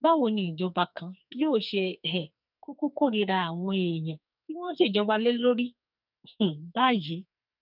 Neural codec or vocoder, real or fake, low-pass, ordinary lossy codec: codec, 44.1 kHz, 2.6 kbps, SNAC; fake; 5.4 kHz; none